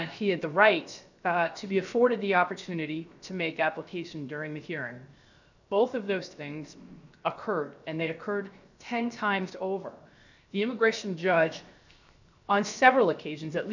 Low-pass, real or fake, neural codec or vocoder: 7.2 kHz; fake; codec, 16 kHz, 0.7 kbps, FocalCodec